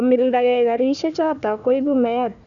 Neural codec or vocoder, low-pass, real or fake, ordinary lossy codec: codec, 16 kHz, 1 kbps, FunCodec, trained on Chinese and English, 50 frames a second; 7.2 kHz; fake; none